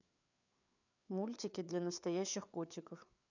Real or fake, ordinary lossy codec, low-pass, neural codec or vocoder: fake; none; 7.2 kHz; codec, 16 kHz, 4 kbps, FreqCodec, larger model